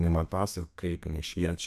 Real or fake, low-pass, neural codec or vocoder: fake; 14.4 kHz; codec, 32 kHz, 1.9 kbps, SNAC